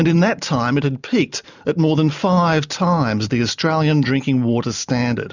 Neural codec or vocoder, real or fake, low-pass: none; real; 7.2 kHz